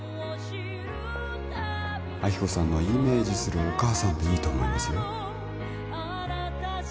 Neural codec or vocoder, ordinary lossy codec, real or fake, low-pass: none; none; real; none